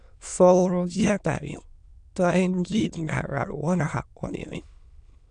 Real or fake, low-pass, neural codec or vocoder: fake; 9.9 kHz; autoencoder, 22.05 kHz, a latent of 192 numbers a frame, VITS, trained on many speakers